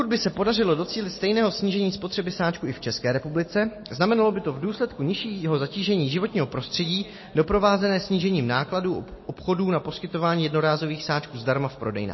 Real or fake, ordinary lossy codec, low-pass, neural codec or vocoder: real; MP3, 24 kbps; 7.2 kHz; none